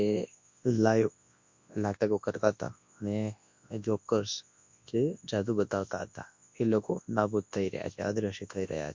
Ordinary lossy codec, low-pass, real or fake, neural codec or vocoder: MP3, 48 kbps; 7.2 kHz; fake; codec, 24 kHz, 0.9 kbps, WavTokenizer, large speech release